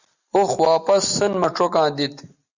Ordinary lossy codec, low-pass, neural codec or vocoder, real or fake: Opus, 64 kbps; 7.2 kHz; none; real